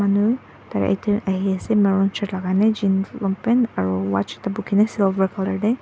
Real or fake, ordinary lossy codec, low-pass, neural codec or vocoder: real; none; none; none